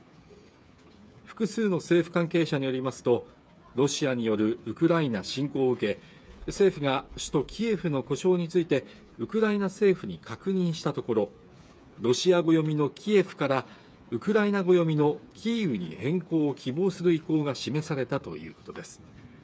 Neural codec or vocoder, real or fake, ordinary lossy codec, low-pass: codec, 16 kHz, 8 kbps, FreqCodec, smaller model; fake; none; none